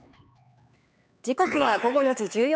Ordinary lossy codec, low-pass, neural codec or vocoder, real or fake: none; none; codec, 16 kHz, 4 kbps, X-Codec, HuBERT features, trained on LibriSpeech; fake